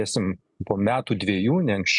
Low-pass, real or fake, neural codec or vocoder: 10.8 kHz; real; none